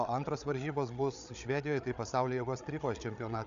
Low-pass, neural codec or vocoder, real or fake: 7.2 kHz; codec, 16 kHz, 8 kbps, FreqCodec, larger model; fake